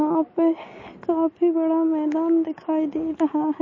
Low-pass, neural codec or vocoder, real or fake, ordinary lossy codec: 7.2 kHz; none; real; MP3, 32 kbps